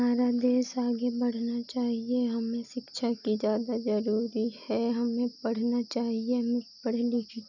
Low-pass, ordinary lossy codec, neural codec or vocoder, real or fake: 7.2 kHz; none; none; real